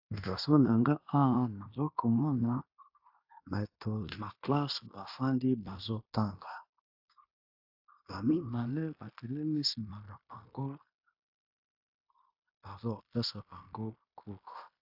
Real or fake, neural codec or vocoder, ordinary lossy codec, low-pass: fake; codec, 16 kHz in and 24 kHz out, 0.9 kbps, LongCat-Audio-Codec, fine tuned four codebook decoder; AAC, 48 kbps; 5.4 kHz